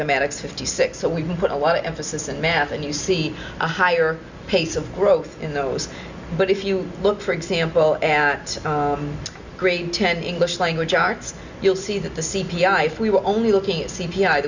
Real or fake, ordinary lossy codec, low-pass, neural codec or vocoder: real; Opus, 64 kbps; 7.2 kHz; none